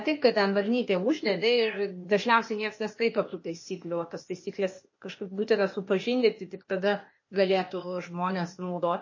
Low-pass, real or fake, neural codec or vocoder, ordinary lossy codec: 7.2 kHz; fake; codec, 16 kHz, 0.8 kbps, ZipCodec; MP3, 32 kbps